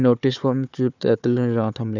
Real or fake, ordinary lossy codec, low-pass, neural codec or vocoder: fake; none; 7.2 kHz; codec, 16 kHz, 4 kbps, FunCodec, trained on Chinese and English, 50 frames a second